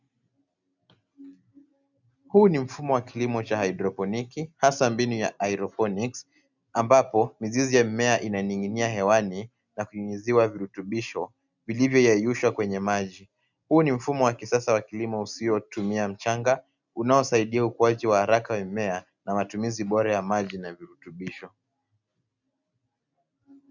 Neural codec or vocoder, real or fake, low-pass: none; real; 7.2 kHz